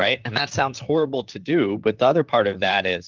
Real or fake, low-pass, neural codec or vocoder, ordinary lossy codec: fake; 7.2 kHz; vocoder, 44.1 kHz, 128 mel bands, Pupu-Vocoder; Opus, 24 kbps